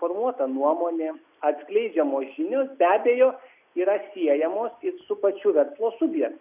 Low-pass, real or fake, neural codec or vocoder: 3.6 kHz; real; none